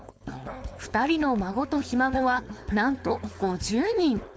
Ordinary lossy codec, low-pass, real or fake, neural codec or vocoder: none; none; fake; codec, 16 kHz, 4.8 kbps, FACodec